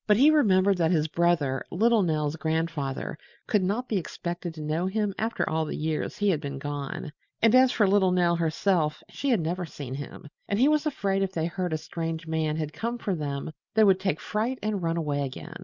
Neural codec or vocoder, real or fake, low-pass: none; real; 7.2 kHz